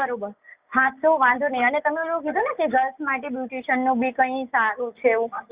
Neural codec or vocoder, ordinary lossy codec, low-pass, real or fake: none; Opus, 64 kbps; 3.6 kHz; real